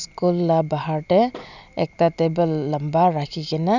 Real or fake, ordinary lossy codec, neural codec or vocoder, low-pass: real; none; none; 7.2 kHz